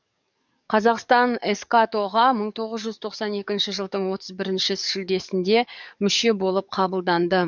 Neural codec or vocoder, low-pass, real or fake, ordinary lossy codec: codec, 44.1 kHz, 7.8 kbps, DAC; 7.2 kHz; fake; none